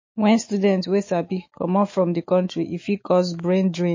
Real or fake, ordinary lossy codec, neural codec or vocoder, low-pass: fake; MP3, 32 kbps; autoencoder, 48 kHz, 128 numbers a frame, DAC-VAE, trained on Japanese speech; 7.2 kHz